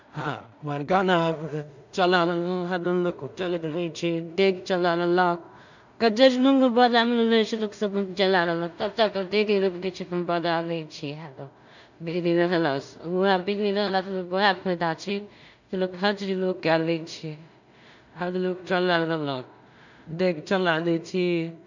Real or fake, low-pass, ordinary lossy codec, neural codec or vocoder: fake; 7.2 kHz; none; codec, 16 kHz in and 24 kHz out, 0.4 kbps, LongCat-Audio-Codec, two codebook decoder